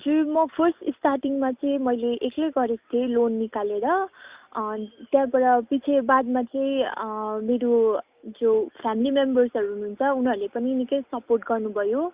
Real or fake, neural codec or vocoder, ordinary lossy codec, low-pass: real; none; Opus, 64 kbps; 3.6 kHz